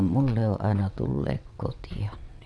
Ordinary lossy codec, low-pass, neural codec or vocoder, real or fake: none; none; vocoder, 22.05 kHz, 80 mel bands, WaveNeXt; fake